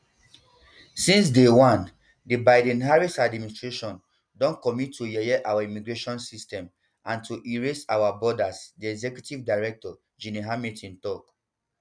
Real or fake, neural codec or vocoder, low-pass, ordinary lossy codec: real; none; 9.9 kHz; none